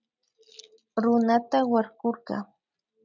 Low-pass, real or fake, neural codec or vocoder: 7.2 kHz; real; none